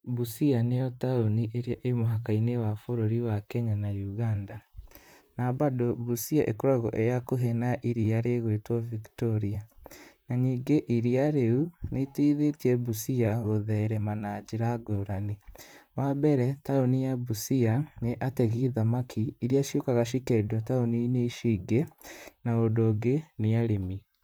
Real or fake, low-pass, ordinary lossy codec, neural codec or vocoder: fake; none; none; vocoder, 44.1 kHz, 128 mel bands, Pupu-Vocoder